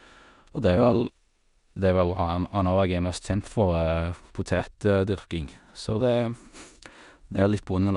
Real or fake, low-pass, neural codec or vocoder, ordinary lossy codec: fake; 10.8 kHz; codec, 16 kHz in and 24 kHz out, 0.9 kbps, LongCat-Audio-Codec, four codebook decoder; none